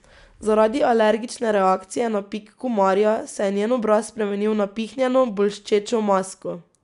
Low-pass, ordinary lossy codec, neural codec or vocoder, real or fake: 10.8 kHz; none; none; real